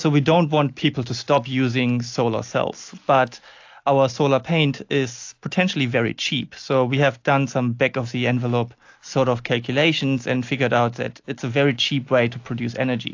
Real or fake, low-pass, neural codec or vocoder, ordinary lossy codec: real; 7.2 kHz; none; AAC, 48 kbps